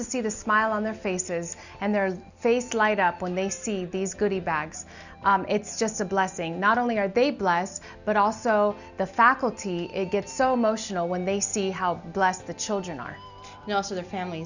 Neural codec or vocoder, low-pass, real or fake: none; 7.2 kHz; real